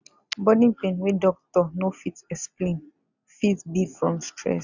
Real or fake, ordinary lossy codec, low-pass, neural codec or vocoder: real; none; 7.2 kHz; none